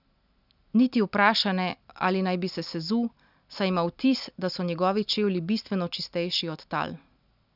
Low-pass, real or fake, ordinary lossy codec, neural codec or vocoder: 5.4 kHz; real; none; none